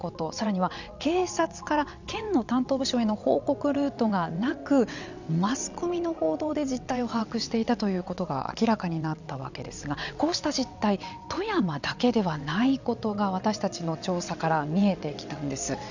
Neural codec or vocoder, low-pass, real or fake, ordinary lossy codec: vocoder, 22.05 kHz, 80 mel bands, WaveNeXt; 7.2 kHz; fake; none